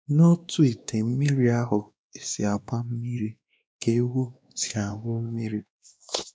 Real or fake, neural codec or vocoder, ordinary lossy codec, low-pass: fake; codec, 16 kHz, 2 kbps, X-Codec, WavLM features, trained on Multilingual LibriSpeech; none; none